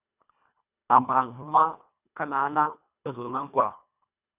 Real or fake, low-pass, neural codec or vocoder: fake; 3.6 kHz; codec, 24 kHz, 1.5 kbps, HILCodec